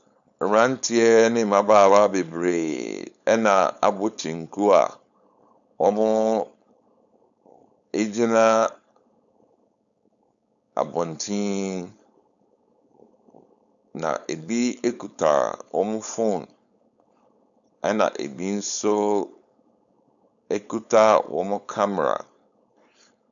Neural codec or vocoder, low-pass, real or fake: codec, 16 kHz, 4.8 kbps, FACodec; 7.2 kHz; fake